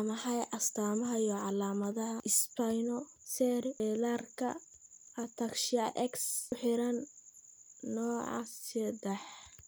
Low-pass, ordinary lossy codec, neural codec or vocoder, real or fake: none; none; none; real